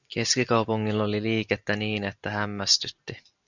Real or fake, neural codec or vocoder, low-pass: real; none; 7.2 kHz